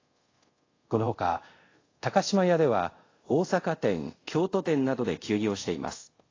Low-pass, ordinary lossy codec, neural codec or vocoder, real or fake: 7.2 kHz; AAC, 32 kbps; codec, 24 kHz, 0.5 kbps, DualCodec; fake